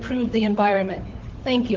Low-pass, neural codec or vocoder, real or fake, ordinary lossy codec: 7.2 kHz; codec, 16 kHz, 16 kbps, FunCodec, trained on LibriTTS, 50 frames a second; fake; Opus, 16 kbps